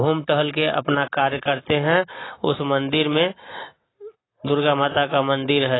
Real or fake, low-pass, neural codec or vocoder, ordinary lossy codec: real; 7.2 kHz; none; AAC, 16 kbps